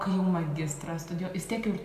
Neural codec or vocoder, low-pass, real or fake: none; 14.4 kHz; real